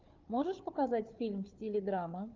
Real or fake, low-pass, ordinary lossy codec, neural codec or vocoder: fake; 7.2 kHz; Opus, 24 kbps; codec, 16 kHz, 4 kbps, FunCodec, trained on Chinese and English, 50 frames a second